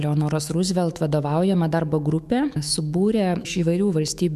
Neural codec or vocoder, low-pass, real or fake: autoencoder, 48 kHz, 128 numbers a frame, DAC-VAE, trained on Japanese speech; 14.4 kHz; fake